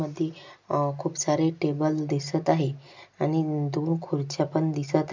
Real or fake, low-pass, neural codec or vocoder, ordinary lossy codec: real; 7.2 kHz; none; MP3, 64 kbps